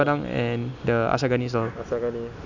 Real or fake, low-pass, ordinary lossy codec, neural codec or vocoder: real; 7.2 kHz; none; none